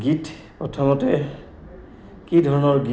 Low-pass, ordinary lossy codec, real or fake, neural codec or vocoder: none; none; real; none